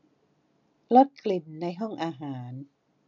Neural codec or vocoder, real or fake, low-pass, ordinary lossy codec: none; real; 7.2 kHz; none